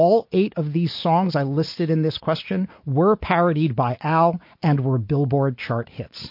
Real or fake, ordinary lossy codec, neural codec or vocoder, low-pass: real; MP3, 32 kbps; none; 5.4 kHz